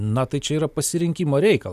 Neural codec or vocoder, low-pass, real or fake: none; 14.4 kHz; real